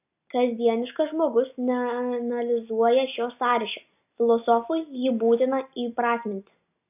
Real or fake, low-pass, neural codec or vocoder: real; 3.6 kHz; none